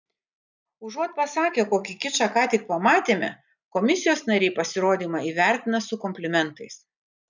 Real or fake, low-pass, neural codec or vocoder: real; 7.2 kHz; none